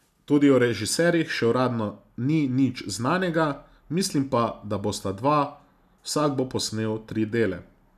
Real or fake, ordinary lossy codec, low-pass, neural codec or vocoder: real; none; 14.4 kHz; none